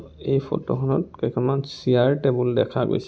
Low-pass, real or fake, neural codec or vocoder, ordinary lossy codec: none; real; none; none